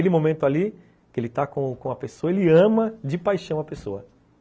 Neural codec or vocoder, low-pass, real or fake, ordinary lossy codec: none; none; real; none